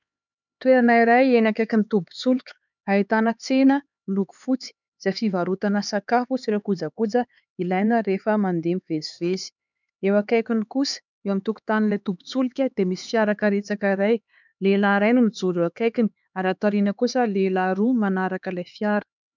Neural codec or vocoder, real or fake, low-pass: codec, 16 kHz, 4 kbps, X-Codec, HuBERT features, trained on LibriSpeech; fake; 7.2 kHz